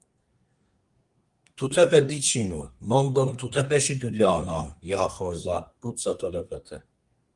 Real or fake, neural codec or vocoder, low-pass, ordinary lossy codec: fake; codec, 24 kHz, 1 kbps, SNAC; 10.8 kHz; Opus, 32 kbps